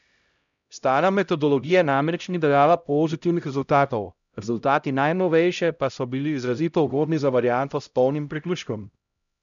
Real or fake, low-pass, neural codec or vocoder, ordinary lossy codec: fake; 7.2 kHz; codec, 16 kHz, 0.5 kbps, X-Codec, HuBERT features, trained on LibriSpeech; none